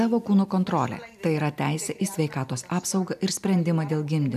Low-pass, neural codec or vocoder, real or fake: 14.4 kHz; none; real